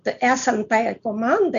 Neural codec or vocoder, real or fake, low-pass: none; real; 7.2 kHz